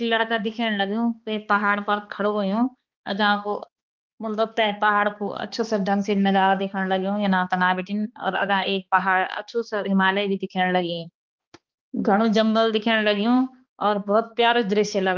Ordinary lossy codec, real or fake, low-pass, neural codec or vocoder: Opus, 32 kbps; fake; 7.2 kHz; codec, 16 kHz, 2 kbps, X-Codec, HuBERT features, trained on balanced general audio